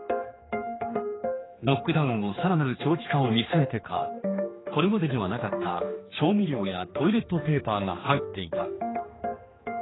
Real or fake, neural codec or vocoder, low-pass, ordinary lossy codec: fake; codec, 16 kHz, 2 kbps, X-Codec, HuBERT features, trained on general audio; 7.2 kHz; AAC, 16 kbps